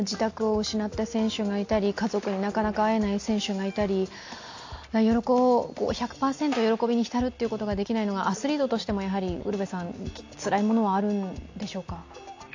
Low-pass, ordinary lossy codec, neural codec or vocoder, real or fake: 7.2 kHz; AAC, 48 kbps; none; real